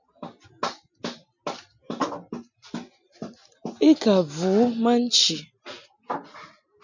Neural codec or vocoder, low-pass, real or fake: none; 7.2 kHz; real